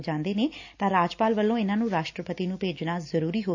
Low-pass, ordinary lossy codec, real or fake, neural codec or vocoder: 7.2 kHz; AAC, 48 kbps; real; none